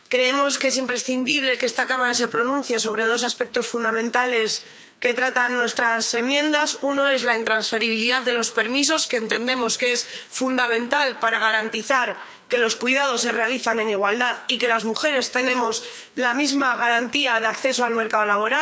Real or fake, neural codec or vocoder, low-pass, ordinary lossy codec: fake; codec, 16 kHz, 2 kbps, FreqCodec, larger model; none; none